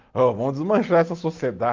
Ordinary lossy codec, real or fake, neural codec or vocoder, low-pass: Opus, 32 kbps; real; none; 7.2 kHz